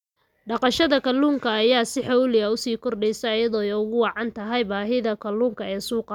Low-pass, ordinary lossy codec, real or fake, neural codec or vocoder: 19.8 kHz; none; real; none